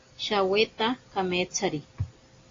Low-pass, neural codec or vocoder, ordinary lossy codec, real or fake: 7.2 kHz; none; AAC, 32 kbps; real